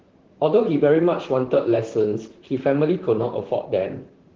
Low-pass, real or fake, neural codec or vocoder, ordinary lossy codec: 7.2 kHz; fake; vocoder, 44.1 kHz, 128 mel bands, Pupu-Vocoder; Opus, 16 kbps